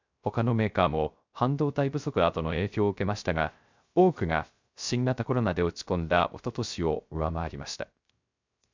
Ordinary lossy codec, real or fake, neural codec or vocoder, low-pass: MP3, 64 kbps; fake; codec, 16 kHz, 0.3 kbps, FocalCodec; 7.2 kHz